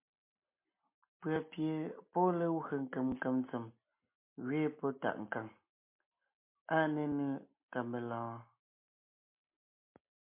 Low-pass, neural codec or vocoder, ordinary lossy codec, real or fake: 3.6 kHz; none; MP3, 24 kbps; real